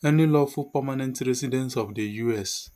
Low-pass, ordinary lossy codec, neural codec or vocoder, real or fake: 14.4 kHz; none; none; real